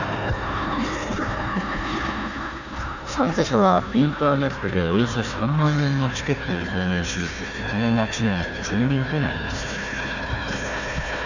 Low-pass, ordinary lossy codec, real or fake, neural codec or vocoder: 7.2 kHz; none; fake; codec, 16 kHz, 1 kbps, FunCodec, trained on Chinese and English, 50 frames a second